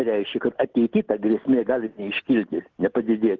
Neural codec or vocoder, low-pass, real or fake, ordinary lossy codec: none; 7.2 kHz; real; Opus, 16 kbps